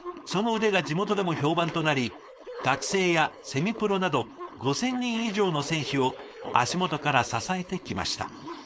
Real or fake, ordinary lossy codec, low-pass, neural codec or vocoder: fake; none; none; codec, 16 kHz, 4.8 kbps, FACodec